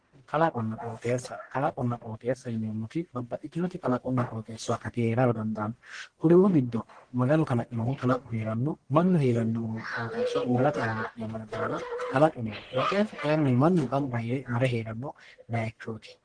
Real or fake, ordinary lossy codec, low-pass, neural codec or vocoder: fake; Opus, 16 kbps; 9.9 kHz; codec, 44.1 kHz, 1.7 kbps, Pupu-Codec